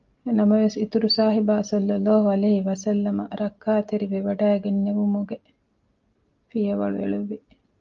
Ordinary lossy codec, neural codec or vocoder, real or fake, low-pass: Opus, 32 kbps; none; real; 7.2 kHz